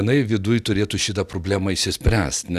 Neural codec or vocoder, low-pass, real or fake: none; 14.4 kHz; real